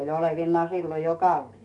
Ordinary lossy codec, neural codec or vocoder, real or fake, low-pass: none; codec, 44.1 kHz, 7.8 kbps, DAC; fake; 10.8 kHz